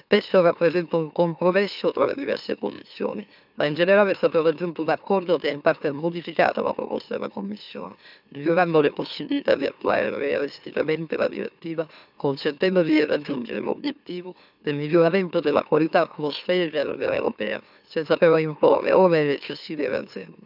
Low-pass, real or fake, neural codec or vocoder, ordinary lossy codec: 5.4 kHz; fake; autoencoder, 44.1 kHz, a latent of 192 numbers a frame, MeloTTS; none